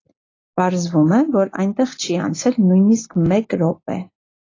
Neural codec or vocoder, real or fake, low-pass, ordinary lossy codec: none; real; 7.2 kHz; AAC, 32 kbps